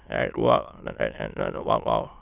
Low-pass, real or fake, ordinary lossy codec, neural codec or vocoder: 3.6 kHz; fake; none; autoencoder, 22.05 kHz, a latent of 192 numbers a frame, VITS, trained on many speakers